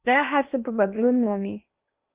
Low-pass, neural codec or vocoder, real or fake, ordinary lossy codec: 3.6 kHz; codec, 16 kHz in and 24 kHz out, 0.8 kbps, FocalCodec, streaming, 65536 codes; fake; Opus, 24 kbps